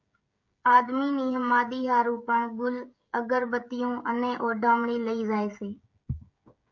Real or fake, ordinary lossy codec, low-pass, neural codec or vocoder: fake; MP3, 48 kbps; 7.2 kHz; codec, 16 kHz, 16 kbps, FreqCodec, smaller model